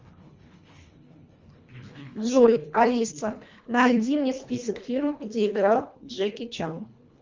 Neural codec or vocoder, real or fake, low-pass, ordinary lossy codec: codec, 24 kHz, 1.5 kbps, HILCodec; fake; 7.2 kHz; Opus, 32 kbps